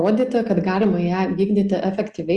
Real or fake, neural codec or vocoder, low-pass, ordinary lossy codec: real; none; 10.8 kHz; Opus, 32 kbps